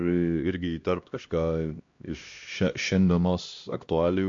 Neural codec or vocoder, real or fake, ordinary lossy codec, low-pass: codec, 16 kHz, 1 kbps, X-Codec, HuBERT features, trained on LibriSpeech; fake; MP3, 48 kbps; 7.2 kHz